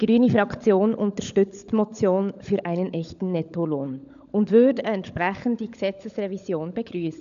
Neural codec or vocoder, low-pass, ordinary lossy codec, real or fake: codec, 16 kHz, 16 kbps, FunCodec, trained on LibriTTS, 50 frames a second; 7.2 kHz; none; fake